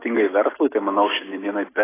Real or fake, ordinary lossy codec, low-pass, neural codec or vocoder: real; AAC, 16 kbps; 3.6 kHz; none